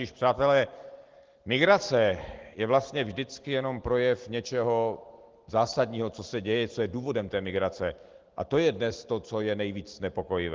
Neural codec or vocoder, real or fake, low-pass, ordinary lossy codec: none; real; 7.2 kHz; Opus, 16 kbps